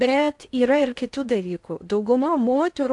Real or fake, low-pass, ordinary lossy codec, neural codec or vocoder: fake; 10.8 kHz; AAC, 64 kbps; codec, 16 kHz in and 24 kHz out, 0.6 kbps, FocalCodec, streaming, 4096 codes